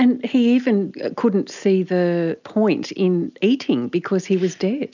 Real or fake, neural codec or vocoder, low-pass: real; none; 7.2 kHz